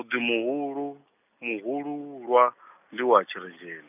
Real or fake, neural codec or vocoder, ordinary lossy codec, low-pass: real; none; none; 3.6 kHz